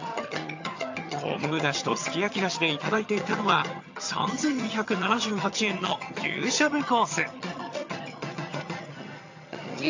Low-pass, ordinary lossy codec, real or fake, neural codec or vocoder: 7.2 kHz; AAC, 48 kbps; fake; vocoder, 22.05 kHz, 80 mel bands, HiFi-GAN